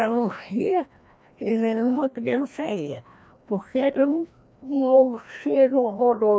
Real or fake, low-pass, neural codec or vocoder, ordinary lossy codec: fake; none; codec, 16 kHz, 1 kbps, FreqCodec, larger model; none